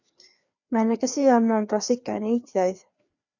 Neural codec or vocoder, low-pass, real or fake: codec, 16 kHz, 2 kbps, FreqCodec, larger model; 7.2 kHz; fake